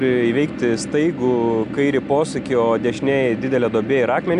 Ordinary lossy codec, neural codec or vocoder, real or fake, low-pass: AAC, 96 kbps; none; real; 10.8 kHz